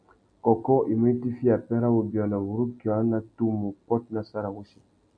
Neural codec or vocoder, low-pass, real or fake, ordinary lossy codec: none; 9.9 kHz; real; MP3, 96 kbps